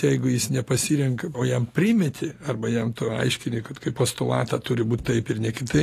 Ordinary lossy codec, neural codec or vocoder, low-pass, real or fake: AAC, 48 kbps; none; 14.4 kHz; real